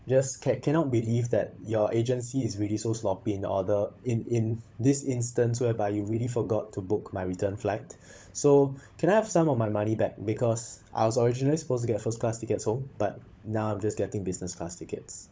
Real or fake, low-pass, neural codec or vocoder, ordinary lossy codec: fake; none; codec, 16 kHz, 16 kbps, FunCodec, trained on LibriTTS, 50 frames a second; none